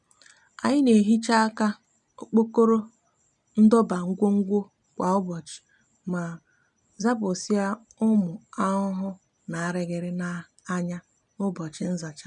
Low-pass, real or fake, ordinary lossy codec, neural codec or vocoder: 10.8 kHz; real; none; none